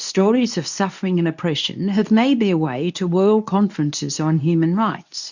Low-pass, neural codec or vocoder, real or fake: 7.2 kHz; codec, 24 kHz, 0.9 kbps, WavTokenizer, medium speech release version 2; fake